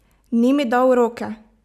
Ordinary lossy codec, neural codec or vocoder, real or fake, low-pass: none; none; real; 14.4 kHz